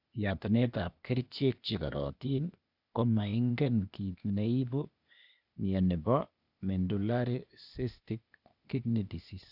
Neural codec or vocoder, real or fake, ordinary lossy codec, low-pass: codec, 16 kHz, 0.8 kbps, ZipCodec; fake; none; 5.4 kHz